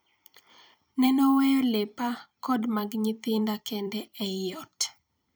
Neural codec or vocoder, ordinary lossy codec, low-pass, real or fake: vocoder, 44.1 kHz, 128 mel bands every 256 samples, BigVGAN v2; none; none; fake